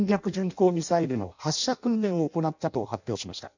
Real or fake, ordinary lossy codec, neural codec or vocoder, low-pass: fake; none; codec, 16 kHz in and 24 kHz out, 0.6 kbps, FireRedTTS-2 codec; 7.2 kHz